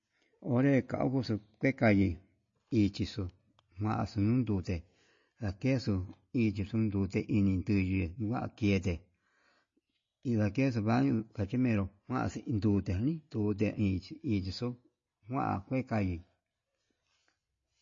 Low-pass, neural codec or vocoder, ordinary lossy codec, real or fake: 7.2 kHz; none; MP3, 32 kbps; real